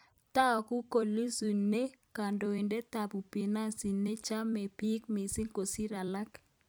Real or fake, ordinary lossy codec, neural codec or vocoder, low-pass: fake; none; vocoder, 44.1 kHz, 128 mel bands every 256 samples, BigVGAN v2; none